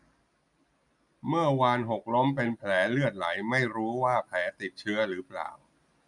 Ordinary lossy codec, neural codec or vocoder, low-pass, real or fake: none; none; 10.8 kHz; real